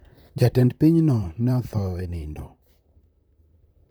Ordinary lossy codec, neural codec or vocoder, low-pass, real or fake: none; vocoder, 44.1 kHz, 128 mel bands, Pupu-Vocoder; none; fake